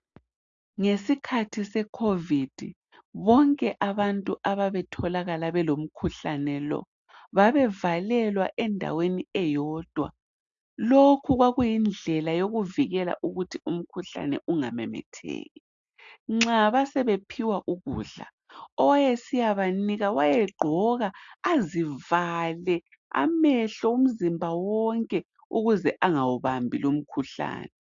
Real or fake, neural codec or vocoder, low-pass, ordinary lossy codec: real; none; 7.2 kHz; AAC, 64 kbps